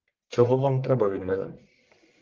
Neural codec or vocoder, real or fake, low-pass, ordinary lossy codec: codec, 44.1 kHz, 1.7 kbps, Pupu-Codec; fake; 7.2 kHz; Opus, 32 kbps